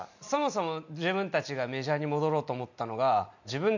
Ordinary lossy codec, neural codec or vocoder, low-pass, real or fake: none; none; 7.2 kHz; real